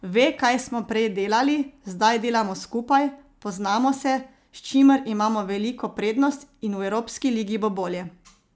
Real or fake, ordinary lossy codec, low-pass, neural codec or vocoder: real; none; none; none